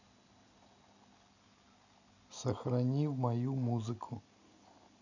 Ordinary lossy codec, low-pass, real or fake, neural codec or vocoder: none; 7.2 kHz; real; none